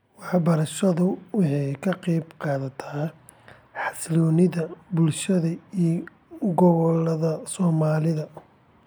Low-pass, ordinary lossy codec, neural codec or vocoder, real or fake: none; none; none; real